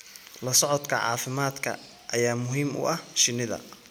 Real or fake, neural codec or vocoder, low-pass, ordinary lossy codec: real; none; none; none